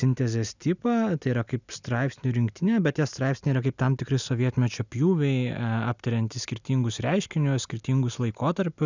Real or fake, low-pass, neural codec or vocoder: real; 7.2 kHz; none